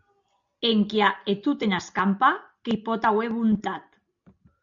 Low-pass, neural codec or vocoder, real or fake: 7.2 kHz; none; real